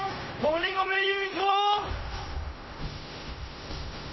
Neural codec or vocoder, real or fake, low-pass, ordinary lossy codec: codec, 16 kHz in and 24 kHz out, 0.4 kbps, LongCat-Audio-Codec, fine tuned four codebook decoder; fake; 7.2 kHz; MP3, 24 kbps